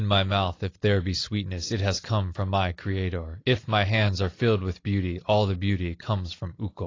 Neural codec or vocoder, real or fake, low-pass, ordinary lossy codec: none; real; 7.2 kHz; AAC, 32 kbps